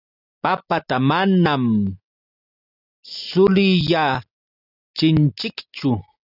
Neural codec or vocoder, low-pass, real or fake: none; 5.4 kHz; real